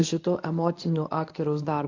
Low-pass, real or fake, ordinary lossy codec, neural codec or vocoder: 7.2 kHz; fake; AAC, 48 kbps; codec, 24 kHz, 0.9 kbps, WavTokenizer, medium speech release version 1